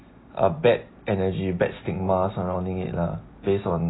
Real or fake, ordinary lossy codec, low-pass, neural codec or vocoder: real; AAC, 16 kbps; 7.2 kHz; none